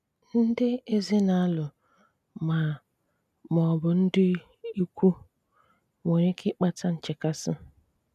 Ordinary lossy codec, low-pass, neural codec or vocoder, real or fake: none; 14.4 kHz; none; real